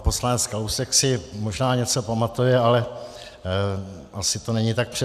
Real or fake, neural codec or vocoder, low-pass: real; none; 14.4 kHz